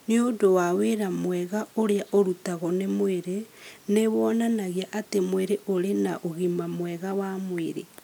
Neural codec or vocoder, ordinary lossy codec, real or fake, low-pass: none; none; real; none